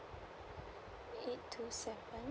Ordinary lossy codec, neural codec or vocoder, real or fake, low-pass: none; none; real; none